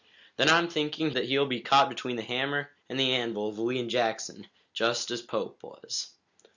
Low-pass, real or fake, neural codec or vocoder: 7.2 kHz; real; none